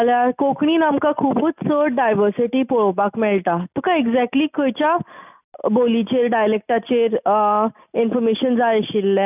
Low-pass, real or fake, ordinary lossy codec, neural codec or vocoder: 3.6 kHz; real; none; none